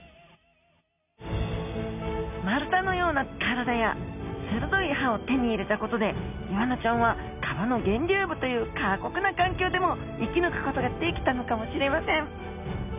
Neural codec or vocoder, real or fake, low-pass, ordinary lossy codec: none; real; 3.6 kHz; none